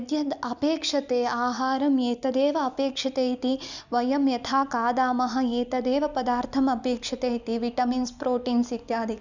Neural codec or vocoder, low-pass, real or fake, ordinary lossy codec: none; 7.2 kHz; real; none